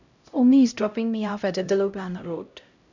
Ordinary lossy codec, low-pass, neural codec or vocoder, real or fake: none; 7.2 kHz; codec, 16 kHz, 0.5 kbps, X-Codec, HuBERT features, trained on LibriSpeech; fake